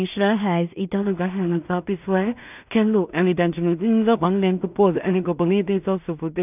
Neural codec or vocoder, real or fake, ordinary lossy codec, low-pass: codec, 16 kHz in and 24 kHz out, 0.4 kbps, LongCat-Audio-Codec, two codebook decoder; fake; none; 3.6 kHz